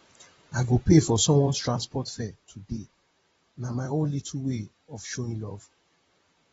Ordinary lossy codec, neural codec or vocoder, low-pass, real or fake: AAC, 24 kbps; vocoder, 44.1 kHz, 128 mel bands, Pupu-Vocoder; 19.8 kHz; fake